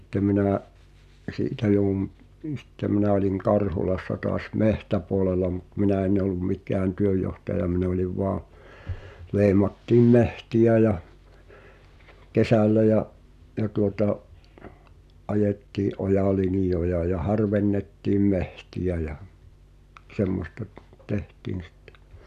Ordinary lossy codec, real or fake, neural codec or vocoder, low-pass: none; real; none; 14.4 kHz